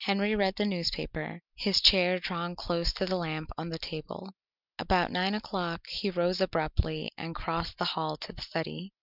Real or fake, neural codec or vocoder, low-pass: real; none; 5.4 kHz